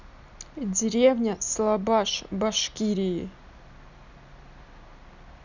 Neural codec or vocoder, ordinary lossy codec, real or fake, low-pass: none; none; real; 7.2 kHz